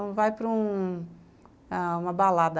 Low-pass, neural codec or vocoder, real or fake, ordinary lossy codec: none; none; real; none